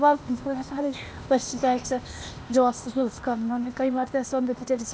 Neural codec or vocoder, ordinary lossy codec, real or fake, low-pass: codec, 16 kHz, 0.8 kbps, ZipCodec; none; fake; none